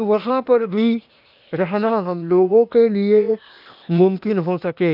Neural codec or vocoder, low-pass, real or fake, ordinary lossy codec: codec, 16 kHz, 0.8 kbps, ZipCodec; 5.4 kHz; fake; none